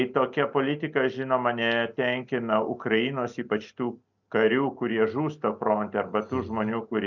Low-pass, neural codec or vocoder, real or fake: 7.2 kHz; none; real